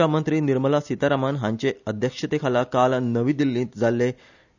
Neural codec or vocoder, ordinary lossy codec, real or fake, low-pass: none; none; real; 7.2 kHz